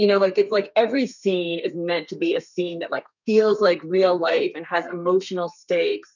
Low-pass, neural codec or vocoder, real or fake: 7.2 kHz; codec, 32 kHz, 1.9 kbps, SNAC; fake